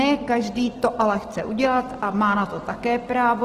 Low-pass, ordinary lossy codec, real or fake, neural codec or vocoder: 14.4 kHz; Opus, 16 kbps; real; none